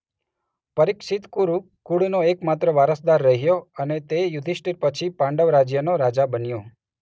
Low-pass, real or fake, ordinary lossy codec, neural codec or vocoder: none; real; none; none